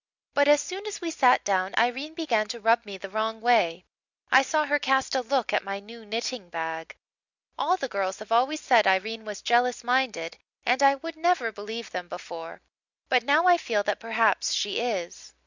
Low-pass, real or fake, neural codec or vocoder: 7.2 kHz; real; none